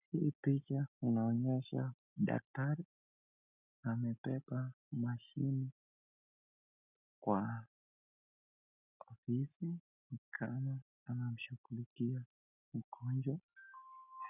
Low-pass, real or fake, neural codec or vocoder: 3.6 kHz; real; none